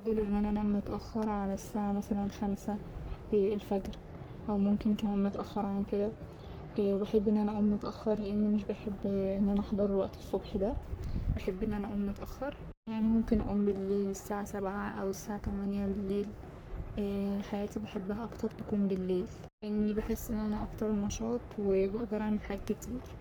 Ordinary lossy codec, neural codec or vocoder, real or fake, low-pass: none; codec, 44.1 kHz, 3.4 kbps, Pupu-Codec; fake; none